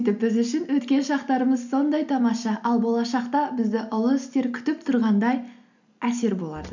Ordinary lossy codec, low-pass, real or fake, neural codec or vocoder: none; 7.2 kHz; real; none